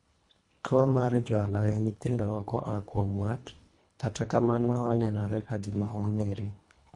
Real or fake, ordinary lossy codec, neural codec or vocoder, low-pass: fake; MP3, 64 kbps; codec, 24 kHz, 1.5 kbps, HILCodec; 10.8 kHz